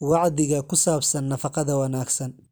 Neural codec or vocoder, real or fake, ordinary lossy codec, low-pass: none; real; none; none